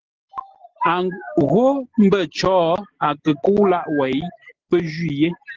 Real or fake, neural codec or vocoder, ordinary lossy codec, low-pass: real; none; Opus, 16 kbps; 7.2 kHz